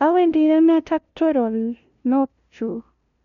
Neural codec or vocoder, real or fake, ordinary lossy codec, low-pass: codec, 16 kHz, 0.5 kbps, FunCodec, trained on LibriTTS, 25 frames a second; fake; none; 7.2 kHz